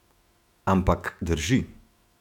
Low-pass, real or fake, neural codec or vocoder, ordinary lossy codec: 19.8 kHz; fake; autoencoder, 48 kHz, 128 numbers a frame, DAC-VAE, trained on Japanese speech; none